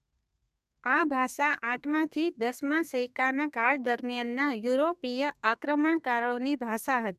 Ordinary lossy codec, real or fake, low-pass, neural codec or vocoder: none; fake; 14.4 kHz; codec, 32 kHz, 1.9 kbps, SNAC